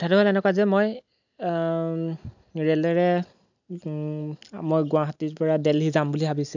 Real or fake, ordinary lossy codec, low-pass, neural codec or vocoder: real; none; 7.2 kHz; none